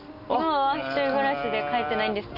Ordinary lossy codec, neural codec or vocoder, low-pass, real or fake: none; none; 5.4 kHz; real